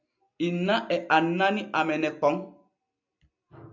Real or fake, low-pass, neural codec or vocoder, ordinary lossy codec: real; 7.2 kHz; none; MP3, 64 kbps